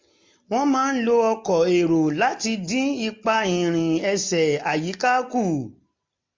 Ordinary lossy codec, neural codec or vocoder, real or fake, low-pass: MP3, 48 kbps; none; real; 7.2 kHz